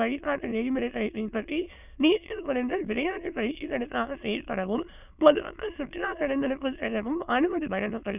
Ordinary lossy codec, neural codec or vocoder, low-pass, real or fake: none; autoencoder, 22.05 kHz, a latent of 192 numbers a frame, VITS, trained on many speakers; 3.6 kHz; fake